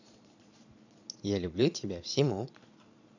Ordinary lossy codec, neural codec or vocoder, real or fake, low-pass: none; vocoder, 22.05 kHz, 80 mel bands, Vocos; fake; 7.2 kHz